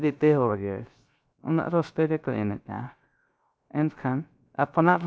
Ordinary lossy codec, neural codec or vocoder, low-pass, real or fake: none; codec, 16 kHz, 0.3 kbps, FocalCodec; none; fake